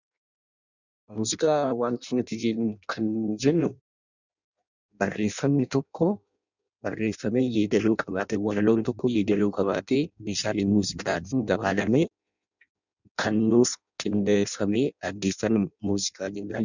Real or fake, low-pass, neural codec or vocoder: fake; 7.2 kHz; codec, 16 kHz in and 24 kHz out, 0.6 kbps, FireRedTTS-2 codec